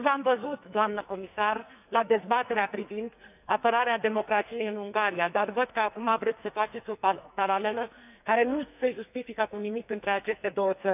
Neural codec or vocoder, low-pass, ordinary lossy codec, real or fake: codec, 44.1 kHz, 2.6 kbps, SNAC; 3.6 kHz; none; fake